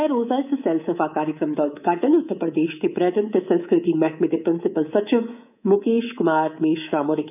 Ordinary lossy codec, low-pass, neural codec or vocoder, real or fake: none; 3.6 kHz; codec, 24 kHz, 3.1 kbps, DualCodec; fake